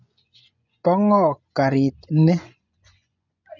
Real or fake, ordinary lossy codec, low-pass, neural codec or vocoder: fake; none; 7.2 kHz; vocoder, 24 kHz, 100 mel bands, Vocos